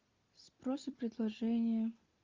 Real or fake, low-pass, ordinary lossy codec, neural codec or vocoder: real; 7.2 kHz; Opus, 32 kbps; none